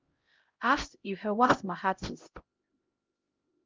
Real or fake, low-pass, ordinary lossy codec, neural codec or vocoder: fake; 7.2 kHz; Opus, 24 kbps; codec, 16 kHz, 0.5 kbps, X-Codec, HuBERT features, trained on LibriSpeech